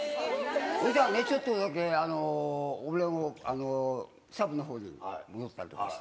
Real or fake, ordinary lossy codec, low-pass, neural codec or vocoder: real; none; none; none